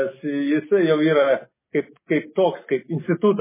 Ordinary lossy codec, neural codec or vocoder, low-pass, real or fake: MP3, 16 kbps; none; 3.6 kHz; real